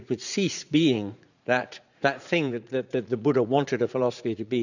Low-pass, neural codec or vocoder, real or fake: 7.2 kHz; vocoder, 44.1 kHz, 80 mel bands, Vocos; fake